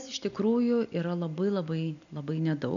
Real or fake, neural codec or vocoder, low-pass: real; none; 7.2 kHz